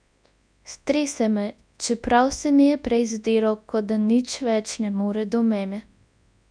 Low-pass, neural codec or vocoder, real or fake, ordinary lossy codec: 9.9 kHz; codec, 24 kHz, 0.9 kbps, WavTokenizer, large speech release; fake; MP3, 96 kbps